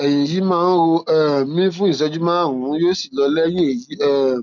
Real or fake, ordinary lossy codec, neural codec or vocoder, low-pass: real; none; none; 7.2 kHz